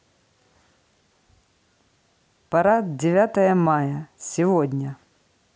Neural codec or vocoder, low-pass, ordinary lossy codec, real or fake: none; none; none; real